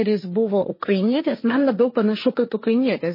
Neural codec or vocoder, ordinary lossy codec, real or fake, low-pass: codec, 16 kHz, 1.1 kbps, Voila-Tokenizer; MP3, 24 kbps; fake; 5.4 kHz